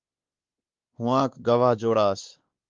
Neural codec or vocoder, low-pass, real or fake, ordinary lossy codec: codec, 16 kHz, 2 kbps, X-Codec, WavLM features, trained on Multilingual LibriSpeech; 7.2 kHz; fake; Opus, 32 kbps